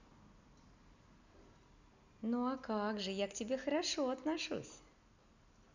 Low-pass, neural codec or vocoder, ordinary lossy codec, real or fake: 7.2 kHz; none; none; real